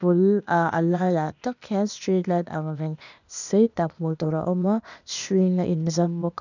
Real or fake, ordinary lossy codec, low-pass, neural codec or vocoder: fake; none; 7.2 kHz; codec, 16 kHz, 0.8 kbps, ZipCodec